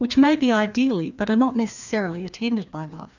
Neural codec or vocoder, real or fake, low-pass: codec, 16 kHz, 2 kbps, FreqCodec, larger model; fake; 7.2 kHz